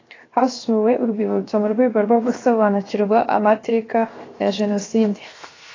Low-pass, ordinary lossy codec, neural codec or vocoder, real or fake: 7.2 kHz; AAC, 32 kbps; codec, 16 kHz, 0.7 kbps, FocalCodec; fake